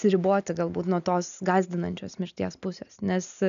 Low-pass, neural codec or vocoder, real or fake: 7.2 kHz; none; real